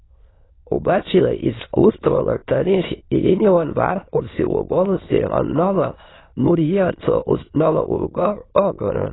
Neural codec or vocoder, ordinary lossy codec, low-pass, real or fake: autoencoder, 22.05 kHz, a latent of 192 numbers a frame, VITS, trained on many speakers; AAC, 16 kbps; 7.2 kHz; fake